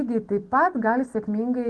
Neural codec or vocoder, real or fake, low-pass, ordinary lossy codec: none; real; 10.8 kHz; Opus, 16 kbps